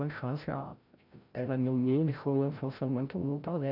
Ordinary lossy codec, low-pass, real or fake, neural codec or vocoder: none; 5.4 kHz; fake; codec, 16 kHz, 0.5 kbps, FreqCodec, larger model